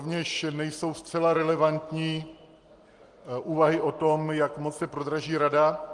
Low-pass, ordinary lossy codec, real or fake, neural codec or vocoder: 10.8 kHz; Opus, 24 kbps; real; none